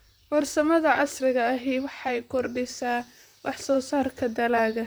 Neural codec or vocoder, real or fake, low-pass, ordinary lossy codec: vocoder, 44.1 kHz, 128 mel bands, Pupu-Vocoder; fake; none; none